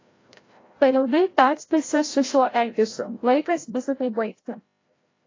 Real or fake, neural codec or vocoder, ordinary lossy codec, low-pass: fake; codec, 16 kHz, 0.5 kbps, FreqCodec, larger model; AAC, 32 kbps; 7.2 kHz